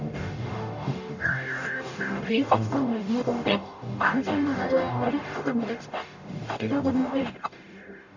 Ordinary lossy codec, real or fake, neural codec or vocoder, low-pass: none; fake; codec, 44.1 kHz, 0.9 kbps, DAC; 7.2 kHz